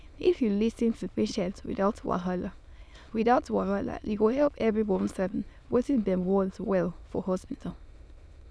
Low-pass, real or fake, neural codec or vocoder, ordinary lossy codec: none; fake; autoencoder, 22.05 kHz, a latent of 192 numbers a frame, VITS, trained on many speakers; none